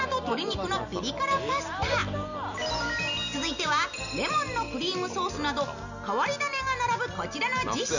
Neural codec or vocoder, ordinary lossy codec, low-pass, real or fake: none; none; 7.2 kHz; real